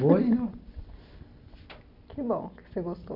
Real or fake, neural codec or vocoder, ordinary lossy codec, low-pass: real; none; none; 5.4 kHz